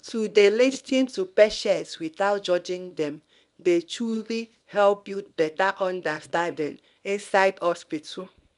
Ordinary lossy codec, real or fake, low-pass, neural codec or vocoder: none; fake; 10.8 kHz; codec, 24 kHz, 0.9 kbps, WavTokenizer, small release